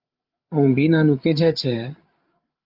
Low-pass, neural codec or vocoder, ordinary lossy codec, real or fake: 5.4 kHz; codec, 16 kHz, 8 kbps, FreqCodec, larger model; Opus, 32 kbps; fake